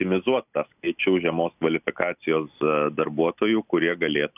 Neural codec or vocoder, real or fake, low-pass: none; real; 3.6 kHz